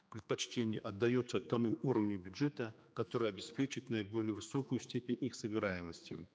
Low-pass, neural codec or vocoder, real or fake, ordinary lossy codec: none; codec, 16 kHz, 2 kbps, X-Codec, HuBERT features, trained on general audio; fake; none